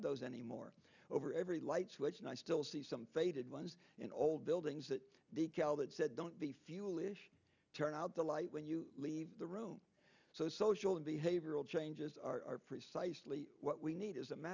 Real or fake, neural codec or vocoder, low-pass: real; none; 7.2 kHz